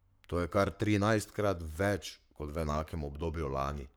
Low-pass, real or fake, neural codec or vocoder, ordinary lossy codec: none; fake; codec, 44.1 kHz, 7.8 kbps, Pupu-Codec; none